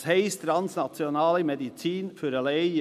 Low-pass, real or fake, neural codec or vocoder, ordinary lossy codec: 14.4 kHz; real; none; none